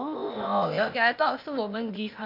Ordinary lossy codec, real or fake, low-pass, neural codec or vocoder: none; fake; 5.4 kHz; codec, 16 kHz, 0.8 kbps, ZipCodec